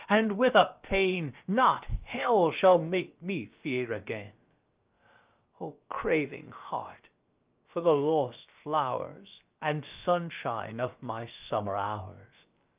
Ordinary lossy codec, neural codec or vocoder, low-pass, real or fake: Opus, 24 kbps; codec, 16 kHz, about 1 kbps, DyCAST, with the encoder's durations; 3.6 kHz; fake